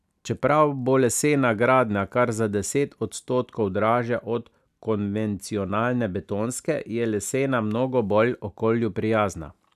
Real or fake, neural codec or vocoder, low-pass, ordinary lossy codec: real; none; 14.4 kHz; none